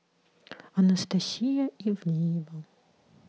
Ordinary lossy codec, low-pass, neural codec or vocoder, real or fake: none; none; none; real